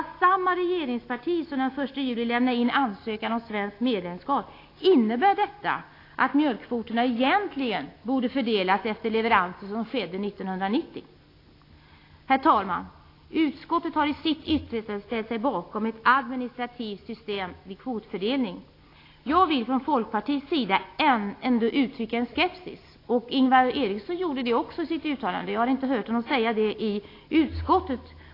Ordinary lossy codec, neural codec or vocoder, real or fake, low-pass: AAC, 32 kbps; none; real; 5.4 kHz